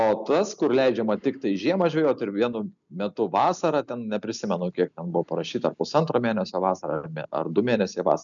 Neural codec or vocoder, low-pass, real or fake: none; 7.2 kHz; real